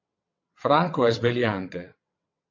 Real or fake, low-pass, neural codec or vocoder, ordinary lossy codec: fake; 7.2 kHz; vocoder, 22.05 kHz, 80 mel bands, WaveNeXt; MP3, 48 kbps